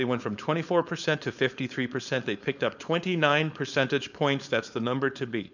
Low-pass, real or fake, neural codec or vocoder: 7.2 kHz; fake; codec, 16 kHz, 4.8 kbps, FACodec